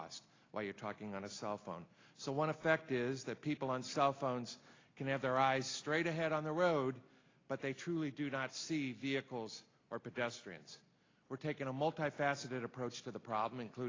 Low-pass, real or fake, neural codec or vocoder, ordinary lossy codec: 7.2 kHz; real; none; AAC, 32 kbps